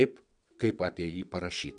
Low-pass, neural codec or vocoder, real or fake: 9.9 kHz; vocoder, 22.05 kHz, 80 mel bands, WaveNeXt; fake